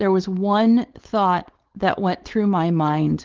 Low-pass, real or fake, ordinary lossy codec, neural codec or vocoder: 7.2 kHz; fake; Opus, 16 kbps; codec, 16 kHz, 4.8 kbps, FACodec